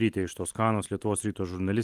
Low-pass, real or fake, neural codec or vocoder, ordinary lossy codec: 14.4 kHz; real; none; Opus, 24 kbps